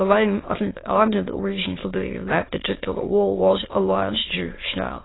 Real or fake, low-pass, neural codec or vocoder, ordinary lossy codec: fake; 7.2 kHz; autoencoder, 22.05 kHz, a latent of 192 numbers a frame, VITS, trained on many speakers; AAC, 16 kbps